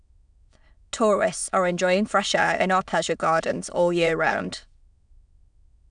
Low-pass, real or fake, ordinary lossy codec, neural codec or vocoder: 9.9 kHz; fake; none; autoencoder, 22.05 kHz, a latent of 192 numbers a frame, VITS, trained on many speakers